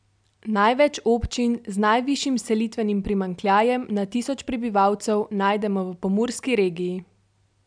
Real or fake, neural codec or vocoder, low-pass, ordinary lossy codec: real; none; 9.9 kHz; MP3, 96 kbps